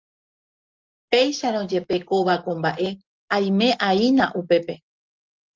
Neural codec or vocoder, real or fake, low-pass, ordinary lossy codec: none; real; 7.2 kHz; Opus, 32 kbps